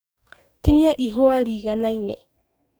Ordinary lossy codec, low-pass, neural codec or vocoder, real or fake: none; none; codec, 44.1 kHz, 2.6 kbps, DAC; fake